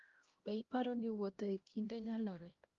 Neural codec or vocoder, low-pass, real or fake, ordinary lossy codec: codec, 16 kHz, 1 kbps, X-Codec, HuBERT features, trained on LibriSpeech; 7.2 kHz; fake; Opus, 32 kbps